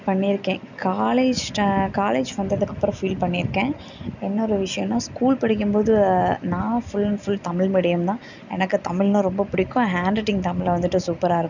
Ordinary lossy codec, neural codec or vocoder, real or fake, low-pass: none; none; real; 7.2 kHz